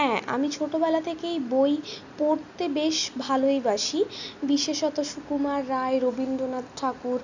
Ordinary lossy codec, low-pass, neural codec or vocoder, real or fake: none; 7.2 kHz; none; real